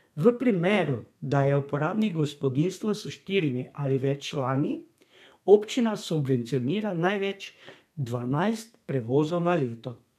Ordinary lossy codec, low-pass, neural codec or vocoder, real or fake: none; 14.4 kHz; codec, 32 kHz, 1.9 kbps, SNAC; fake